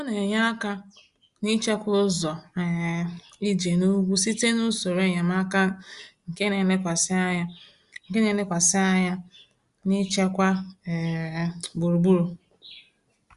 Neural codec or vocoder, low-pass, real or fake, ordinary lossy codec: none; 10.8 kHz; real; Opus, 64 kbps